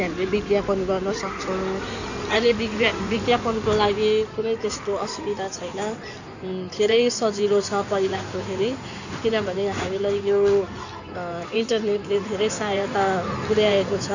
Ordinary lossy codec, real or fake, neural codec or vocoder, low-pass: none; fake; codec, 16 kHz in and 24 kHz out, 2.2 kbps, FireRedTTS-2 codec; 7.2 kHz